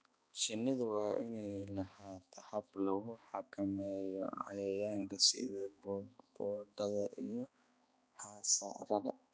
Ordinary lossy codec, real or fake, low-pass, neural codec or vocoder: none; fake; none; codec, 16 kHz, 2 kbps, X-Codec, HuBERT features, trained on balanced general audio